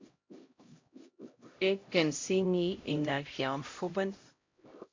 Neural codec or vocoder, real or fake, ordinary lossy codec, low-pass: codec, 16 kHz, 0.5 kbps, X-Codec, HuBERT features, trained on LibriSpeech; fake; MP3, 48 kbps; 7.2 kHz